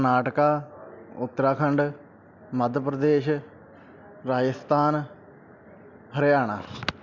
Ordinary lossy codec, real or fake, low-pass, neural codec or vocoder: MP3, 64 kbps; real; 7.2 kHz; none